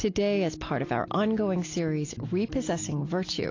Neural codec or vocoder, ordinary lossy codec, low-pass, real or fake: none; AAC, 32 kbps; 7.2 kHz; real